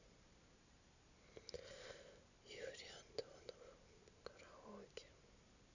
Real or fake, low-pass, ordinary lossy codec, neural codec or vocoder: real; 7.2 kHz; none; none